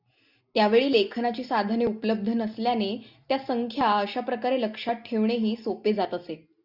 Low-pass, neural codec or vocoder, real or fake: 5.4 kHz; none; real